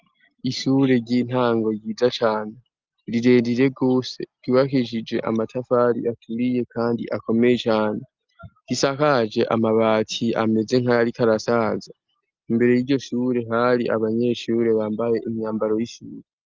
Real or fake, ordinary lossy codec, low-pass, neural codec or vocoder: real; Opus, 24 kbps; 7.2 kHz; none